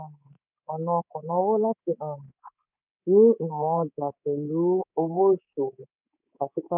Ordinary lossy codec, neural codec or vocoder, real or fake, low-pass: none; codec, 24 kHz, 3.1 kbps, DualCodec; fake; 3.6 kHz